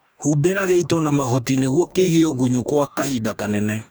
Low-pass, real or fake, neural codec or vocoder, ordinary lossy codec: none; fake; codec, 44.1 kHz, 2.6 kbps, DAC; none